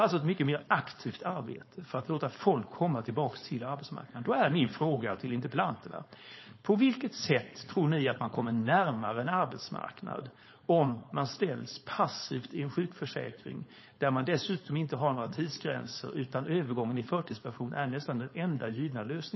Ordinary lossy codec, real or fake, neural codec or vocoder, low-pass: MP3, 24 kbps; fake; codec, 16 kHz, 4.8 kbps, FACodec; 7.2 kHz